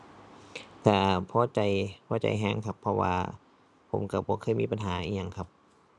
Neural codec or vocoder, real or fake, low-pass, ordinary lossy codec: none; real; none; none